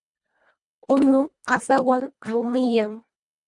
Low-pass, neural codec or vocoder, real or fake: 10.8 kHz; codec, 24 kHz, 1.5 kbps, HILCodec; fake